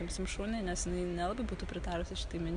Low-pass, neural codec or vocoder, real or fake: 9.9 kHz; none; real